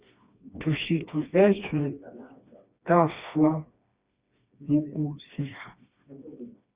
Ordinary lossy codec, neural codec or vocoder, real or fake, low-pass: Opus, 64 kbps; codec, 16 kHz, 2 kbps, FreqCodec, smaller model; fake; 3.6 kHz